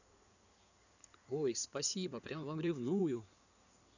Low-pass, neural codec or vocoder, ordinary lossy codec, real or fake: 7.2 kHz; codec, 16 kHz in and 24 kHz out, 2.2 kbps, FireRedTTS-2 codec; none; fake